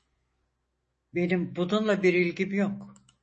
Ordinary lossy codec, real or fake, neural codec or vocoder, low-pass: MP3, 32 kbps; fake; autoencoder, 48 kHz, 128 numbers a frame, DAC-VAE, trained on Japanese speech; 10.8 kHz